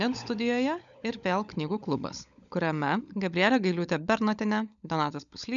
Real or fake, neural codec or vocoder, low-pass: fake; codec, 16 kHz, 16 kbps, FunCodec, trained on LibriTTS, 50 frames a second; 7.2 kHz